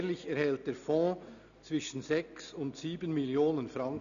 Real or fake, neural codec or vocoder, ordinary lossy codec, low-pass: real; none; none; 7.2 kHz